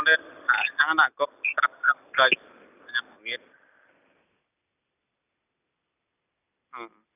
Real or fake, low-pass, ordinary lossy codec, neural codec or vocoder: real; 3.6 kHz; none; none